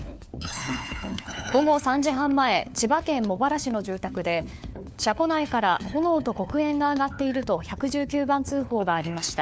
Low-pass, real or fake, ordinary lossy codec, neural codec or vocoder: none; fake; none; codec, 16 kHz, 4 kbps, FunCodec, trained on LibriTTS, 50 frames a second